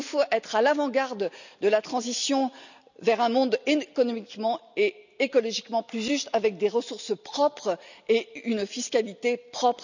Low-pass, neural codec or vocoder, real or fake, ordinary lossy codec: 7.2 kHz; none; real; none